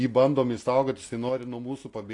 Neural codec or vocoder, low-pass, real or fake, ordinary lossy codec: none; 10.8 kHz; real; AAC, 48 kbps